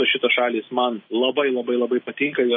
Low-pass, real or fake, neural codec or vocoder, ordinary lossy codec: 7.2 kHz; real; none; MP3, 24 kbps